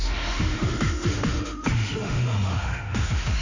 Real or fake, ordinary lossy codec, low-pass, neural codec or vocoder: fake; none; 7.2 kHz; autoencoder, 48 kHz, 32 numbers a frame, DAC-VAE, trained on Japanese speech